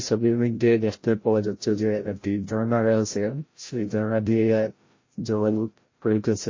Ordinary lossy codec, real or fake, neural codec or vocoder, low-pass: MP3, 32 kbps; fake; codec, 16 kHz, 0.5 kbps, FreqCodec, larger model; 7.2 kHz